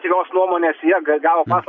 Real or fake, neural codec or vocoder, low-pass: real; none; 7.2 kHz